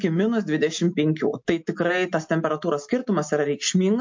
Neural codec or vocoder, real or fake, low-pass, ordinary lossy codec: none; real; 7.2 kHz; MP3, 48 kbps